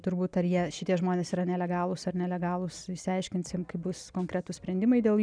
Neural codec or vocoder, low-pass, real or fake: none; 9.9 kHz; real